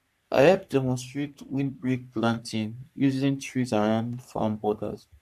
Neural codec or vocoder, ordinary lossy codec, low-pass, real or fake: codec, 44.1 kHz, 3.4 kbps, Pupu-Codec; MP3, 96 kbps; 14.4 kHz; fake